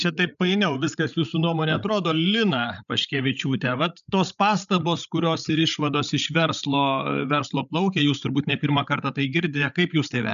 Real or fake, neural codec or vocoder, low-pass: fake; codec, 16 kHz, 16 kbps, FreqCodec, larger model; 7.2 kHz